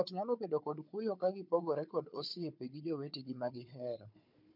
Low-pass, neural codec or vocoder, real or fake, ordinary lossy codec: 5.4 kHz; codec, 16 kHz, 4 kbps, FunCodec, trained on Chinese and English, 50 frames a second; fake; MP3, 48 kbps